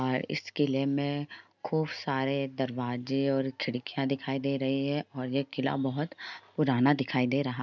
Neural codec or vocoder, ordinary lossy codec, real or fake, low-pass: none; none; real; 7.2 kHz